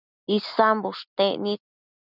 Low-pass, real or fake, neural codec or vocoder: 5.4 kHz; real; none